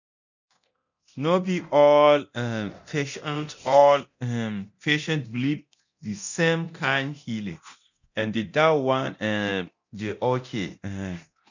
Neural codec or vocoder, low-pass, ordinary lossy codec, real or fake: codec, 24 kHz, 0.9 kbps, DualCodec; 7.2 kHz; none; fake